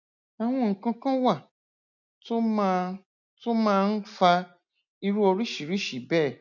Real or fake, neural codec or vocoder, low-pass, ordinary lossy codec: real; none; 7.2 kHz; none